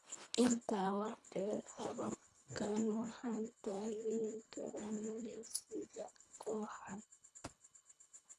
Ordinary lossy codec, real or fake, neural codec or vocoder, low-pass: none; fake; codec, 24 kHz, 1.5 kbps, HILCodec; none